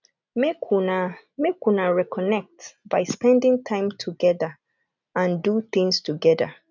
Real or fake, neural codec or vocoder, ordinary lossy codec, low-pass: real; none; none; 7.2 kHz